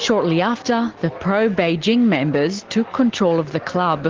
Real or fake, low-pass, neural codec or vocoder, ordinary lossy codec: real; 7.2 kHz; none; Opus, 16 kbps